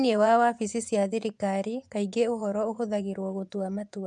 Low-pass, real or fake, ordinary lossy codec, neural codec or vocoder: 10.8 kHz; fake; none; vocoder, 24 kHz, 100 mel bands, Vocos